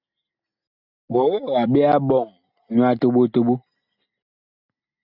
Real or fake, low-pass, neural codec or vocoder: real; 5.4 kHz; none